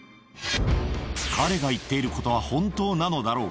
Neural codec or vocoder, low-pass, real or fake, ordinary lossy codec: none; none; real; none